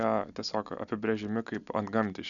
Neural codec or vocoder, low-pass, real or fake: none; 7.2 kHz; real